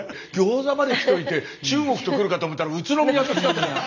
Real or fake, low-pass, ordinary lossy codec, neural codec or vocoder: real; 7.2 kHz; none; none